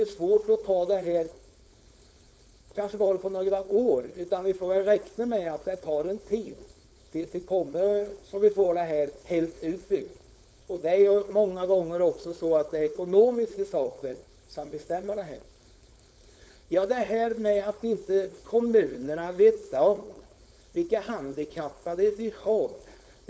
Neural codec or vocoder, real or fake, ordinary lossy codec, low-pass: codec, 16 kHz, 4.8 kbps, FACodec; fake; none; none